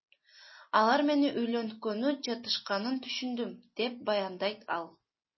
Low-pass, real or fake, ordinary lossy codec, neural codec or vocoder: 7.2 kHz; real; MP3, 24 kbps; none